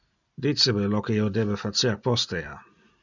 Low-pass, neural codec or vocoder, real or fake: 7.2 kHz; none; real